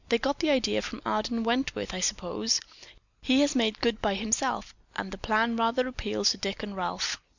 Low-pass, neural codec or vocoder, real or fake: 7.2 kHz; none; real